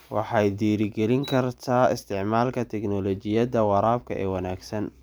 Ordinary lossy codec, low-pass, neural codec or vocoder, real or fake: none; none; vocoder, 44.1 kHz, 128 mel bands every 512 samples, BigVGAN v2; fake